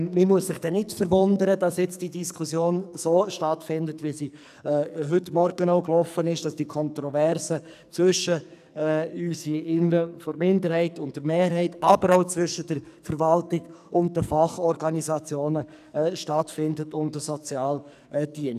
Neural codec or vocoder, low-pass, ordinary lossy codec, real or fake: codec, 44.1 kHz, 2.6 kbps, SNAC; 14.4 kHz; none; fake